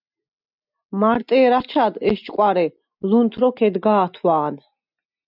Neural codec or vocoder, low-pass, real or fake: none; 5.4 kHz; real